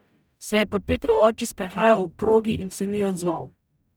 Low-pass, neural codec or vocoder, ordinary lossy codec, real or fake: none; codec, 44.1 kHz, 0.9 kbps, DAC; none; fake